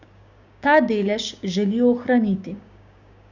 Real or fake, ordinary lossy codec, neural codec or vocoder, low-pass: fake; none; codec, 16 kHz, 6 kbps, DAC; 7.2 kHz